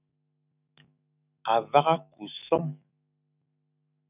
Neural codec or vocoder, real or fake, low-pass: autoencoder, 48 kHz, 128 numbers a frame, DAC-VAE, trained on Japanese speech; fake; 3.6 kHz